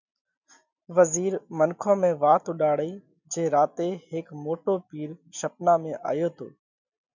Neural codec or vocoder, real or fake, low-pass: none; real; 7.2 kHz